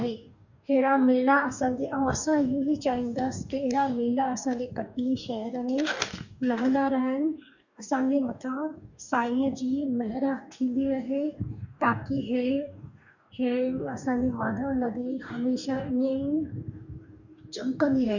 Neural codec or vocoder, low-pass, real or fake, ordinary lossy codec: codec, 44.1 kHz, 2.6 kbps, DAC; 7.2 kHz; fake; none